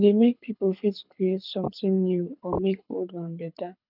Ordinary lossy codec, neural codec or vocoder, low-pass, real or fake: none; codec, 24 kHz, 6 kbps, HILCodec; 5.4 kHz; fake